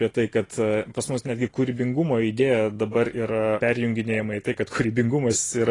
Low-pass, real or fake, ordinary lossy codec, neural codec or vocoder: 10.8 kHz; real; AAC, 32 kbps; none